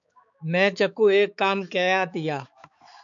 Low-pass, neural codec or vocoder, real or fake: 7.2 kHz; codec, 16 kHz, 4 kbps, X-Codec, HuBERT features, trained on balanced general audio; fake